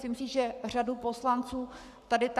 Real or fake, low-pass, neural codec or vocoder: fake; 14.4 kHz; codec, 44.1 kHz, 7.8 kbps, Pupu-Codec